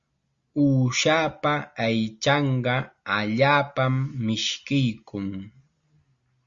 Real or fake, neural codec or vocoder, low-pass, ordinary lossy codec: real; none; 7.2 kHz; Opus, 64 kbps